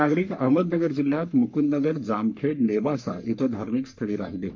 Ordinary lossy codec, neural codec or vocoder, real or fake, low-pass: MP3, 48 kbps; codec, 44.1 kHz, 3.4 kbps, Pupu-Codec; fake; 7.2 kHz